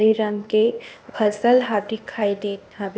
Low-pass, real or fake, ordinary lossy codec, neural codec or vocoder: none; fake; none; codec, 16 kHz, 0.8 kbps, ZipCodec